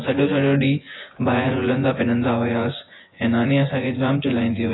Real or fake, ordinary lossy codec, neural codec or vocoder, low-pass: fake; AAC, 16 kbps; vocoder, 24 kHz, 100 mel bands, Vocos; 7.2 kHz